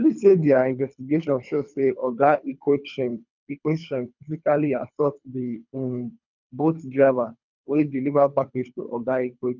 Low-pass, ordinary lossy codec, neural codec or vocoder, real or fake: 7.2 kHz; none; codec, 24 kHz, 3 kbps, HILCodec; fake